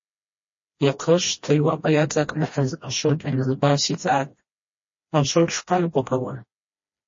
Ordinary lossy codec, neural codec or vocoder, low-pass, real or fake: MP3, 32 kbps; codec, 16 kHz, 1 kbps, FreqCodec, smaller model; 7.2 kHz; fake